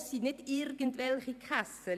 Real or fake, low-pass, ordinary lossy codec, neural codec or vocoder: fake; 14.4 kHz; none; vocoder, 44.1 kHz, 128 mel bands every 512 samples, BigVGAN v2